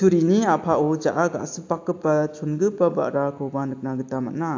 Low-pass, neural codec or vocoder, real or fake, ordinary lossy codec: 7.2 kHz; none; real; AAC, 48 kbps